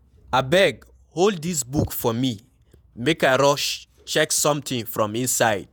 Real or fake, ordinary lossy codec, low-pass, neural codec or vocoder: fake; none; none; vocoder, 48 kHz, 128 mel bands, Vocos